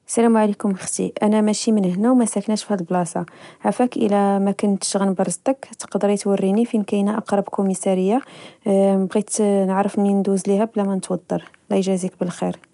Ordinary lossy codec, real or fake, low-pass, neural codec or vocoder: none; real; 10.8 kHz; none